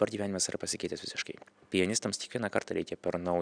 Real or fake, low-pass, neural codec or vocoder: real; 9.9 kHz; none